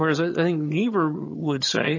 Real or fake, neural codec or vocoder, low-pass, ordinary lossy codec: fake; vocoder, 22.05 kHz, 80 mel bands, HiFi-GAN; 7.2 kHz; MP3, 32 kbps